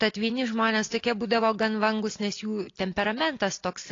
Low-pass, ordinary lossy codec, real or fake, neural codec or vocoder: 7.2 kHz; AAC, 32 kbps; real; none